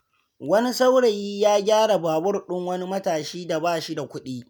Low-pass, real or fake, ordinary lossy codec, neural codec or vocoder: none; real; none; none